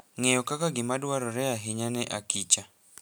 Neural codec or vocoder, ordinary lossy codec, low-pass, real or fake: none; none; none; real